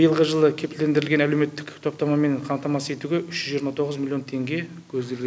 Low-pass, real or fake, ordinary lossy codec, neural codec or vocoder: none; real; none; none